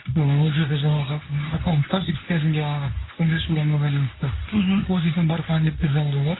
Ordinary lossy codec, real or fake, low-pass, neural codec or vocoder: AAC, 16 kbps; fake; 7.2 kHz; codec, 24 kHz, 0.9 kbps, WavTokenizer, medium speech release version 2